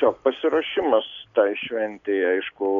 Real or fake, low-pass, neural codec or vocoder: real; 7.2 kHz; none